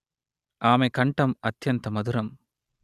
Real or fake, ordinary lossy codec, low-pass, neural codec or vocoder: real; Opus, 64 kbps; 14.4 kHz; none